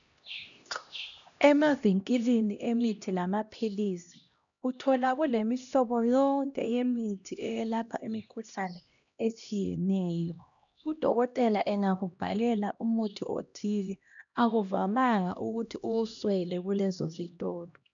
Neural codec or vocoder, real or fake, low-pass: codec, 16 kHz, 1 kbps, X-Codec, HuBERT features, trained on LibriSpeech; fake; 7.2 kHz